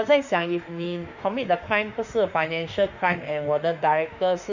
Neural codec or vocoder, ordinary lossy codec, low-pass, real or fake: autoencoder, 48 kHz, 32 numbers a frame, DAC-VAE, trained on Japanese speech; none; 7.2 kHz; fake